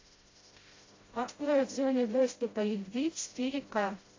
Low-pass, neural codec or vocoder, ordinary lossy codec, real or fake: 7.2 kHz; codec, 16 kHz, 0.5 kbps, FreqCodec, smaller model; AAC, 32 kbps; fake